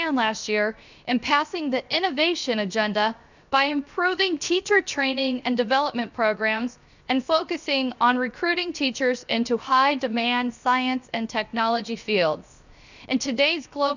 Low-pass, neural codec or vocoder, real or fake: 7.2 kHz; codec, 16 kHz, 0.7 kbps, FocalCodec; fake